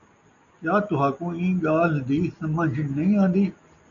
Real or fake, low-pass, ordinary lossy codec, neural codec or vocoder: real; 7.2 kHz; MP3, 96 kbps; none